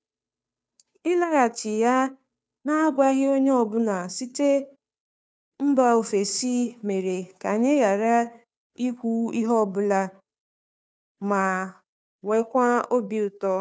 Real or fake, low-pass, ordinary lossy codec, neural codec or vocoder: fake; none; none; codec, 16 kHz, 2 kbps, FunCodec, trained on Chinese and English, 25 frames a second